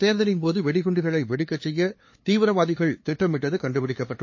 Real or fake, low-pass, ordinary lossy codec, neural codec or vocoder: fake; 7.2 kHz; MP3, 32 kbps; codec, 16 kHz, 2 kbps, FunCodec, trained on LibriTTS, 25 frames a second